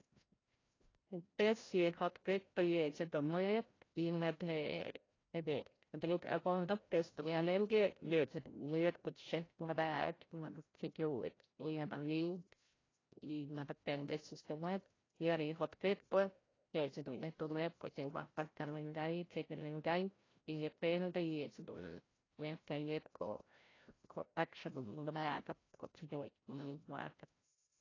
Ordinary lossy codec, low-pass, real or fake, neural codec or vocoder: AAC, 32 kbps; 7.2 kHz; fake; codec, 16 kHz, 0.5 kbps, FreqCodec, larger model